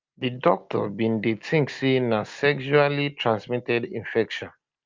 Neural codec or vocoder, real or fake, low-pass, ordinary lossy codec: none; real; 7.2 kHz; Opus, 24 kbps